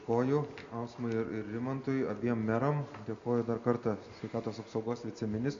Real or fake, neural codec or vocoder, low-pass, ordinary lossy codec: real; none; 7.2 kHz; MP3, 64 kbps